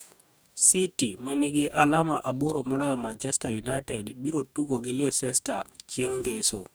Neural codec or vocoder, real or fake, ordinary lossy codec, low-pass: codec, 44.1 kHz, 2.6 kbps, DAC; fake; none; none